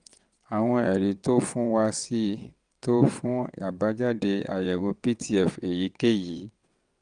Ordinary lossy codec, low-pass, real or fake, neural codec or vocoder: Opus, 32 kbps; 9.9 kHz; fake; vocoder, 22.05 kHz, 80 mel bands, WaveNeXt